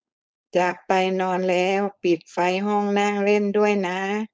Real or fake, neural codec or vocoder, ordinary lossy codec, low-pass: fake; codec, 16 kHz, 4.8 kbps, FACodec; none; none